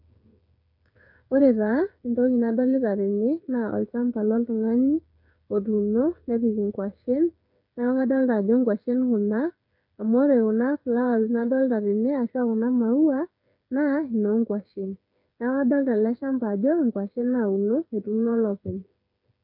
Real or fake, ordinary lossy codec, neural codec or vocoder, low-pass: fake; none; codec, 16 kHz, 8 kbps, FreqCodec, smaller model; 5.4 kHz